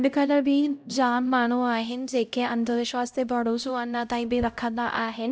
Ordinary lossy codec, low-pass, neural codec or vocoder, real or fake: none; none; codec, 16 kHz, 0.5 kbps, X-Codec, HuBERT features, trained on LibriSpeech; fake